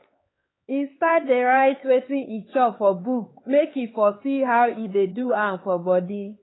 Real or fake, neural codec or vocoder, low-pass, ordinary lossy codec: fake; codec, 16 kHz, 4 kbps, X-Codec, HuBERT features, trained on LibriSpeech; 7.2 kHz; AAC, 16 kbps